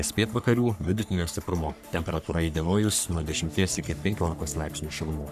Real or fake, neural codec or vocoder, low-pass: fake; codec, 44.1 kHz, 3.4 kbps, Pupu-Codec; 14.4 kHz